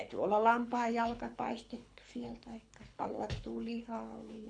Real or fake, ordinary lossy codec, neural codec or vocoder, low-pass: fake; none; codec, 24 kHz, 6 kbps, HILCodec; 9.9 kHz